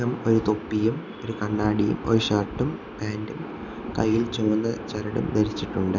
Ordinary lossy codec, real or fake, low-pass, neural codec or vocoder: none; real; 7.2 kHz; none